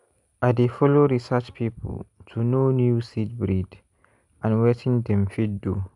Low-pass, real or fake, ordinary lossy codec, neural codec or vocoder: 10.8 kHz; real; none; none